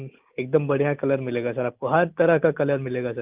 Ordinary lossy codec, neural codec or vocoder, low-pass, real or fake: Opus, 32 kbps; none; 3.6 kHz; real